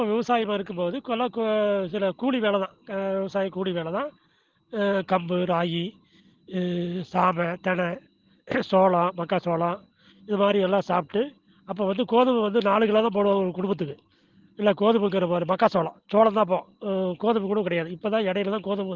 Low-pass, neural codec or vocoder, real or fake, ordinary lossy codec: 7.2 kHz; none; real; Opus, 16 kbps